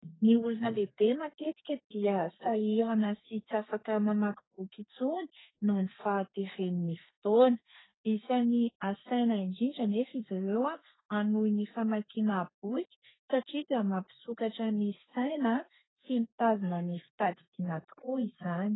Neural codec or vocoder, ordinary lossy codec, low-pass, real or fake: codec, 32 kHz, 1.9 kbps, SNAC; AAC, 16 kbps; 7.2 kHz; fake